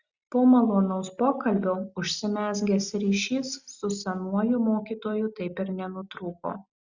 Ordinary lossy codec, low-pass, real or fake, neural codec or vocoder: Opus, 64 kbps; 7.2 kHz; real; none